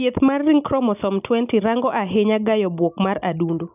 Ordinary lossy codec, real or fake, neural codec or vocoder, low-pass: none; real; none; 3.6 kHz